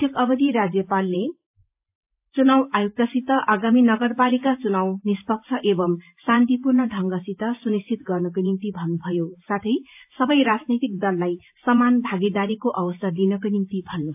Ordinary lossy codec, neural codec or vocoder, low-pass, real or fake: AAC, 32 kbps; vocoder, 44.1 kHz, 128 mel bands every 512 samples, BigVGAN v2; 3.6 kHz; fake